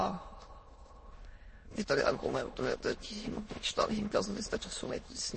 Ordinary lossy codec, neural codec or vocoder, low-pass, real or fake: MP3, 32 kbps; autoencoder, 22.05 kHz, a latent of 192 numbers a frame, VITS, trained on many speakers; 9.9 kHz; fake